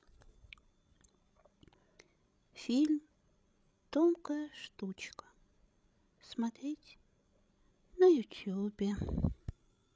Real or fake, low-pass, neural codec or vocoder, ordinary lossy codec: fake; none; codec, 16 kHz, 16 kbps, FreqCodec, larger model; none